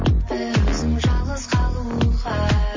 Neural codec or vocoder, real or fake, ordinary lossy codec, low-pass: none; real; MP3, 32 kbps; 7.2 kHz